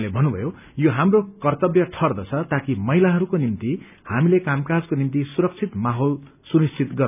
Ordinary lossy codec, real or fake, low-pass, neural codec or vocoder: none; real; 3.6 kHz; none